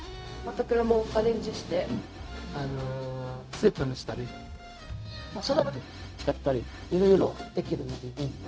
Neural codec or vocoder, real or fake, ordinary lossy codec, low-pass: codec, 16 kHz, 0.4 kbps, LongCat-Audio-Codec; fake; none; none